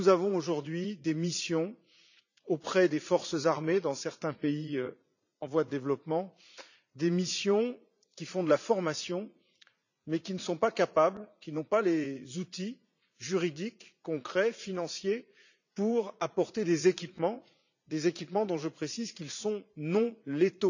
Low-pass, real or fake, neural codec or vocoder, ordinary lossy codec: 7.2 kHz; fake; vocoder, 44.1 kHz, 80 mel bands, Vocos; none